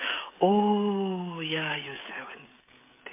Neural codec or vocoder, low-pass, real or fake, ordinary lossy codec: codec, 16 kHz, 16 kbps, FreqCodec, smaller model; 3.6 kHz; fake; MP3, 24 kbps